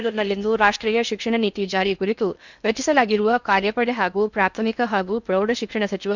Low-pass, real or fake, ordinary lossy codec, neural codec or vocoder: 7.2 kHz; fake; none; codec, 16 kHz in and 24 kHz out, 0.6 kbps, FocalCodec, streaming, 2048 codes